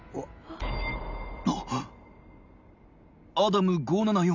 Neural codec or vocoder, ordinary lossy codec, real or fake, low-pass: none; none; real; 7.2 kHz